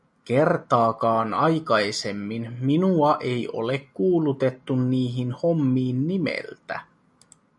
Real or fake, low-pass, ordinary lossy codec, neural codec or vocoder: real; 10.8 kHz; MP3, 96 kbps; none